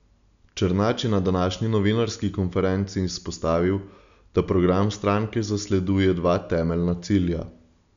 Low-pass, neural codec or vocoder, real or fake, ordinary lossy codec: 7.2 kHz; none; real; none